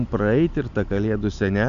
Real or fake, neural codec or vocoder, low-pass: real; none; 7.2 kHz